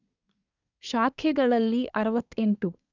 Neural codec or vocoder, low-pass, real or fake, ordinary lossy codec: codec, 24 kHz, 1 kbps, SNAC; 7.2 kHz; fake; none